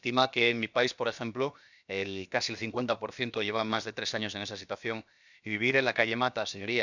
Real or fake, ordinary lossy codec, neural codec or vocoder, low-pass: fake; none; codec, 16 kHz, about 1 kbps, DyCAST, with the encoder's durations; 7.2 kHz